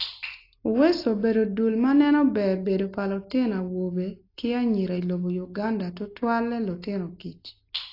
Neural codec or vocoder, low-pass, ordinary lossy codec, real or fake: none; 5.4 kHz; AAC, 32 kbps; real